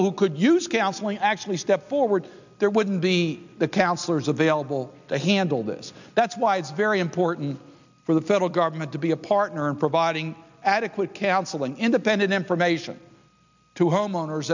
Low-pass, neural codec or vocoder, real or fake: 7.2 kHz; none; real